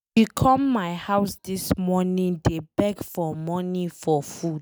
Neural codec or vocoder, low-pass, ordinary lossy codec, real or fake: none; none; none; real